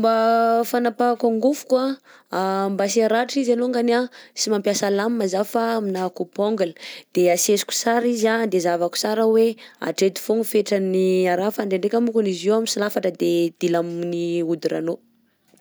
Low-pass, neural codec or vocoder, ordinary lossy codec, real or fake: none; none; none; real